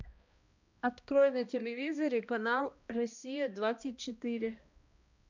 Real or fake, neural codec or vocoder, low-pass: fake; codec, 16 kHz, 1 kbps, X-Codec, HuBERT features, trained on balanced general audio; 7.2 kHz